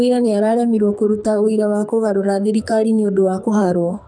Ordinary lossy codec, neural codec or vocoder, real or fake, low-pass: none; codec, 44.1 kHz, 2.6 kbps, SNAC; fake; 9.9 kHz